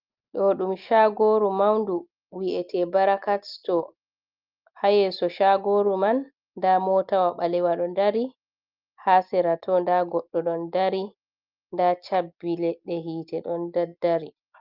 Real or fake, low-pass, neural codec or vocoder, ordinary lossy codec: real; 5.4 kHz; none; Opus, 24 kbps